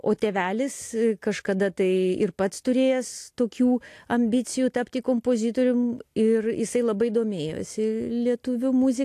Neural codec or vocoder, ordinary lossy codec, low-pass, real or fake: none; AAC, 64 kbps; 14.4 kHz; real